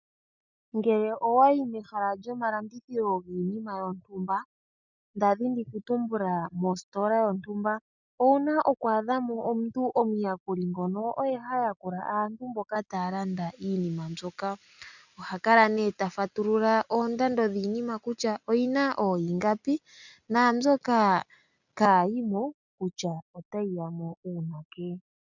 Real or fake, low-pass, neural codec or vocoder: real; 7.2 kHz; none